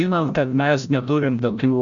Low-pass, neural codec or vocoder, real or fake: 7.2 kHz; codec, 16 kHz, 0.5 kbps, FreqCodec, larger model; fake